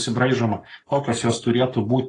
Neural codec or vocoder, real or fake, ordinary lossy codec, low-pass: codec, 44.1 kHz, 7.8 kbps, Pupu-Codec; fake; AAC, 32 kbps; 10.8 kHz